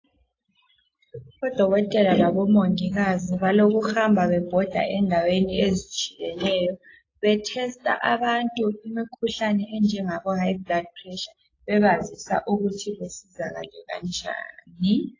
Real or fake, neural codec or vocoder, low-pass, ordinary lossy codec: real; none; 7.2 kHz; AAC, 32 kbps